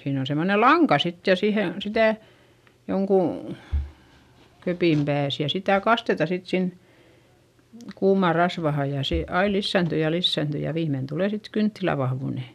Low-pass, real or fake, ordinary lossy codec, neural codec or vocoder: 14.4 kHz; real; none; none